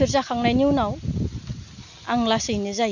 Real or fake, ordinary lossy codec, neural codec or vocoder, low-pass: real; none; none; 7.2 kHz